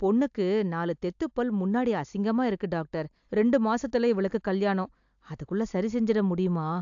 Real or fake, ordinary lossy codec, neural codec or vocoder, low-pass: real; AAC, 64 kbps; none; 7.2 kHz